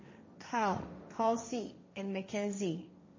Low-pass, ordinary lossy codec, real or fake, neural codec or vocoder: 7.2 kHz; MP3, 32 kbps; fake; codec, 16 kHz, 1.1 kbps, Voila-Tokenizer